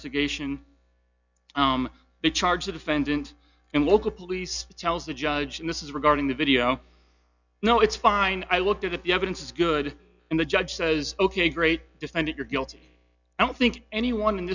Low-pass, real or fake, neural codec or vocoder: 7.2 kHz; real; none